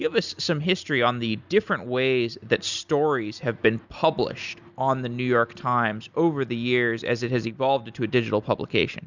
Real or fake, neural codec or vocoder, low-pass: real; none; 7.2 kHz